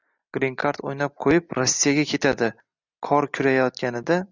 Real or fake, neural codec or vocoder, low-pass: real; none; 7.2 kHz